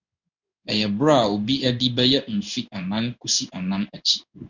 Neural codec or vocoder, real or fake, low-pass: codec, 16 kHz in and 24 kHz out, 1 kbps, XY-Tokenizer; fake; 7.2 kHz